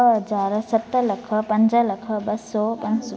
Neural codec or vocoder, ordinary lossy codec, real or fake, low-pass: none; none; real; none